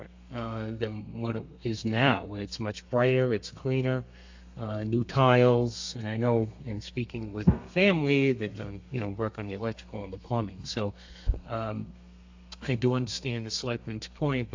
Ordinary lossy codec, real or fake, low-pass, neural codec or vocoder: AAC, 48 kbps; fake; 7.2 kHz; codec, 32 kHz, 1.9 kbps, SNAC